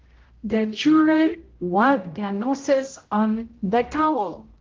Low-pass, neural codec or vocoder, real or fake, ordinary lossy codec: 7.2 kHz; codec, 16 kHz, 0.5 kbps, X-Codec, HuBERT features, trained on general audio; fake; Opus, 16 kbps